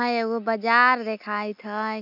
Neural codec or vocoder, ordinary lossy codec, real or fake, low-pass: none; none; real; 5.4 kHz